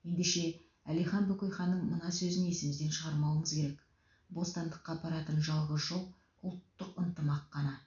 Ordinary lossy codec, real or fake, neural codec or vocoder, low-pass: none; real; none; 7.2 kHz